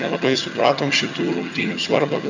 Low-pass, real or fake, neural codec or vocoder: 7.2 kHz; fake; vocoder, 22.05 kHz, 80 mel bands, HiFi-GAN